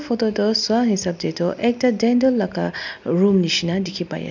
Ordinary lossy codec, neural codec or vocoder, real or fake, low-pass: none; none; real; 7.2 kHz